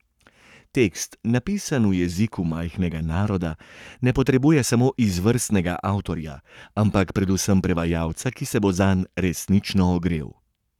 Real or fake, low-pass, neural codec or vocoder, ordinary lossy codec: fake; 19.8 kHz; codec, 44.1 kHz, 7.8 kbps, Pupu-Codec; none